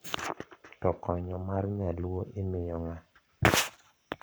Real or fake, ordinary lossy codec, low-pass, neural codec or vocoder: fake; none; none; codec, 44.1 kHz, 7.8 kbps, Pupu-Codec